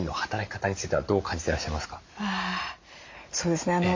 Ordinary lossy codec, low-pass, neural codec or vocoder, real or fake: MP3, 48 kbps; 7.2 kHz; none; real